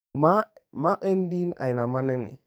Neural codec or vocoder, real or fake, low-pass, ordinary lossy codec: codec, 44.1 kHz, 2.6 kbps, SNAC; fake; none; none